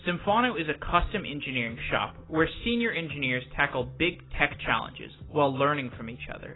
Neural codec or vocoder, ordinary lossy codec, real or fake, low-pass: none; AAC, 16 kbps; real; 7.2 kHz